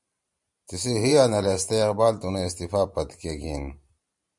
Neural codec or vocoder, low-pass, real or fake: vocoder, 44.1 kHz, 128 mel bands every 512 samples, BigVGAN v2; 10.8 kHz; fake